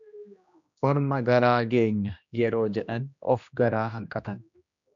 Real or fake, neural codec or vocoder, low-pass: fake; codec, 16 kHz, 1 kbps, X-Codec, HuBERT features, trained on general audio; 7.2 kHz